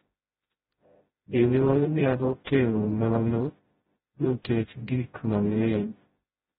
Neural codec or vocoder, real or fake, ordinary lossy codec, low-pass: codec, 16 kHz, 0.5 kbps, FreqCodec, smaller model; fake; AAC, 16 kbps; 7.2 kHz